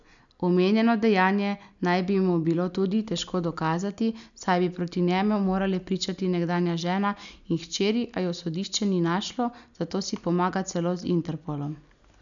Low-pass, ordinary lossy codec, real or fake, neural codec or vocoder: 7.2 kHz; none; real; none